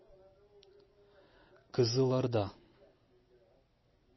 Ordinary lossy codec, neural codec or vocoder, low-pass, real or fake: MP3, 24 kbps; none; 7.2 kHz; real